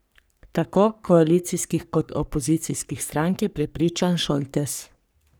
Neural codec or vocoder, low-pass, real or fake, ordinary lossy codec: codec, 44.1 kHz, 3.4 kbps, Pupu-Codec; none; fake; none